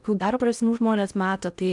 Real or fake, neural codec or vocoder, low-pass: fake; codec, 16 kHz in and 24 kHz out, 0.6 kbps, FocalCodec, streaming, 4096 codes; 10.8 kHz